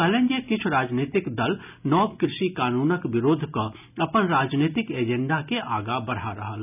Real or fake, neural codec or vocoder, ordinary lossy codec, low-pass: real; none; none; 3.6 kHz